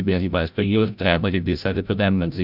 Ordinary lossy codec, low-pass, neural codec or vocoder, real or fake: none; 5.4 kHz; codec, 16 kHz, 0.5 kbps, FreqCodec, larger model; fake